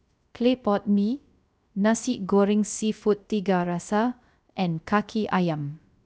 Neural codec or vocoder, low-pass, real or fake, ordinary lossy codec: codec, 16 kHz, 0.3 kbps, FocalCodec; none; fake; none